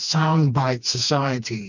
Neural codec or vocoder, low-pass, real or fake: codec, 16 kHz, 2 kbps, FreqCodec, smaller model; 7.2 kHz; fake